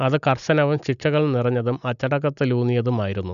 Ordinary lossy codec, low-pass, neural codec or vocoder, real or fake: none; 7.2 kHz; none; real